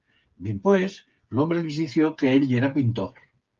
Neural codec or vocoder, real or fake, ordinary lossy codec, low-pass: codec, 16 kHz, 8 kbps, FreqCodec, smaller model; fake; Opus, 32 kbps; 7.2 kHz